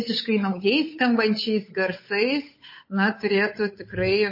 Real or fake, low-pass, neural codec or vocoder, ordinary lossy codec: fake; 5.4 kHz; codec, 16 kHz, 8 kbps, FunCodec, trained on Chinese and English, 25 frames a second; MP3, 24 kbps